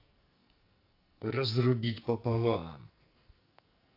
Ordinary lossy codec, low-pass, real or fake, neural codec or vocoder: AAC, 32 kbps; 5.4 kHz; fake; codec, 44.1 kHz, 2.6 kbps, SNAC